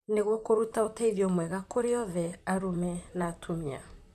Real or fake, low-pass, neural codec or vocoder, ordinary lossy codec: fake; 14.4 kHz; vocoder, 44.1 kHz, 128 mel bands, Pupu-Vocoder; none